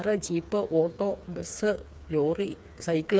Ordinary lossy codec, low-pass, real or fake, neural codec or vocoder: none; none; fake; codec, 16 kHz, 4 kbps, FreqCodec, smaller model